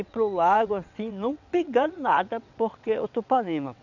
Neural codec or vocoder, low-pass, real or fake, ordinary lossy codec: vocoder, 22.05 kHz, 80 mel bands, WaveNeXt; 7.2 kHz; fake; none